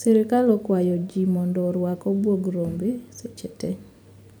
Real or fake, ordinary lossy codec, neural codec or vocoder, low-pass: fake; none; vocoder, 44.1 kHz, 128 mel bands every 256 samples, BigVGAN v2; 19.8 kHz